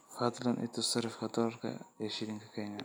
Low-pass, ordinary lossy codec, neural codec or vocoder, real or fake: none; none; none; real